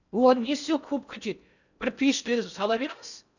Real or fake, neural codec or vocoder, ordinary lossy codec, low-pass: fake; codec, 16 kHz in and 24 kHz out, 0.6 kbps, FocalCodec, streaming, 4096 codes; none; 7.2 kHz